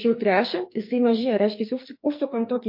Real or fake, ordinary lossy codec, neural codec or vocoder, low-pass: fake; MP3, 32 kbps; codec, 44.1 kHz, 2.6 kbps, SNAC; 5.4 kHz